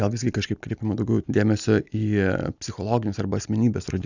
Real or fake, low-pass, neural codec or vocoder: real; 7.2 kHz; none